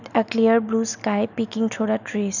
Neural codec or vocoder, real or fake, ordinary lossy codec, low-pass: none; real; none; 7.2 kHz